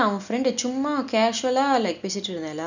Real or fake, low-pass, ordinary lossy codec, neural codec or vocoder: real; 7.2 kHz; none; none